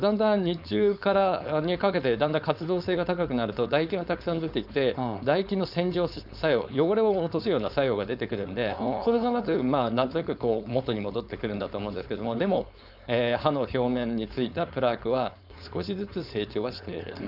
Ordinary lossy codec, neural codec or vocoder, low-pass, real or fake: none; codec, 16 kHz, 4.8 kbps, FACodec; 5.4 kHz; fake